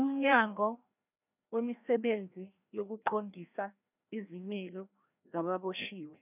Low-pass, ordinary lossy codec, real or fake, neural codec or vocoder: 3.6 kHz; none; fake; codec, 16 kHz, 1 kbps, FreqCodec, larger model